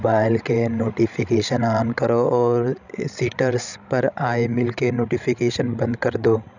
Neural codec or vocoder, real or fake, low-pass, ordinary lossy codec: codec, 16 kHz, 16 kbps, FreqCodec, larger model; fake; 7.2 kHz; none